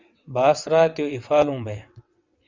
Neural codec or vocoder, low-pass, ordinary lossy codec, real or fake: vocoder, 22.05 kHz, 80 mel bands, WaveNeXt; 7.2 kHz; Opus, 64 kbps; fake